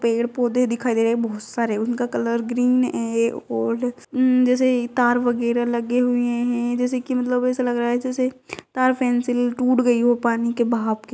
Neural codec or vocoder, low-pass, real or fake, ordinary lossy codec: none; none; real; none